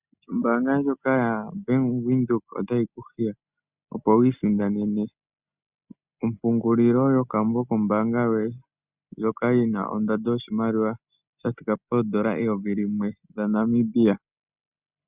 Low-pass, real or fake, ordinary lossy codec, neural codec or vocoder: 3.6 kHz; real; Opus, 64 kbps; none